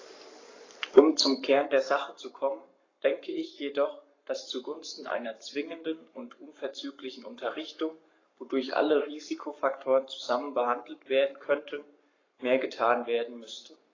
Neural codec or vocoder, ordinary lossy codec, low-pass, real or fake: codec, 44.1 kHz, 7.8 kbps, Pupu-Codec; AAC, 32 kbps; 7.2 kHz; fake